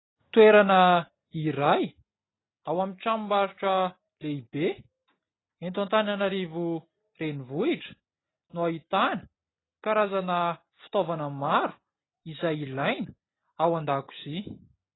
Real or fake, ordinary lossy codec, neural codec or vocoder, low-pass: real; AAC, 16 kbps; none; 7.2 kHz